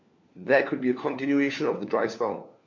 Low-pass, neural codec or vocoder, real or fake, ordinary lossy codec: 7.2 kHz; codec, 16 kHz, 4 kbps, FunCodec, trained on LibriTTS, 50 frames a second; fake; MP3, 48 kbps